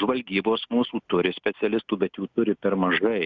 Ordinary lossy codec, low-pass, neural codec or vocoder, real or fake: Opus, 64 kbps; 7.2 kHz; none; real